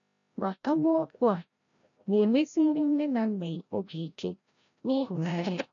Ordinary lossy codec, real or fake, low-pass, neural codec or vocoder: none; fake; 7.2 kHz; codec, 16 kHz, 0.5 kbps, FreqCodec, larger model